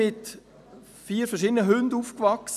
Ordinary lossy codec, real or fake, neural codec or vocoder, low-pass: none; real; none; 14.4 kHz